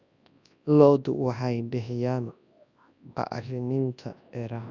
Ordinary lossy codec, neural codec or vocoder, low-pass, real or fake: none; codec, 24 kHz, 0.9 kbps, WavTokenizer, large speech release; 7.2 kHz; fake